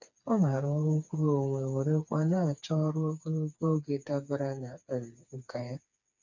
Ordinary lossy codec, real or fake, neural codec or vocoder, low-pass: none; fake; codec, 16 kHz, 4 kbps, FreqCodec, smaller model; 7.2 kHz